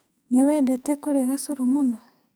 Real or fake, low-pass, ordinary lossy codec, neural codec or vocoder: fake; none; none; codec, 44.1 kHz, 2.6 kbps, SNAC